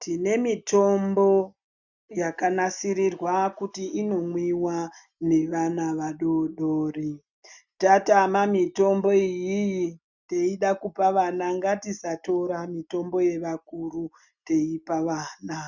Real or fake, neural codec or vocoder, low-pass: real; none; 7.2 kHz